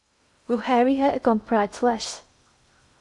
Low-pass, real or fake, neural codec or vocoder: 10.8 kHz; fake; codec, 16 kHz in and 24 kHz out, 0.6 kbps, FocalCodec, streaming, 2048 codes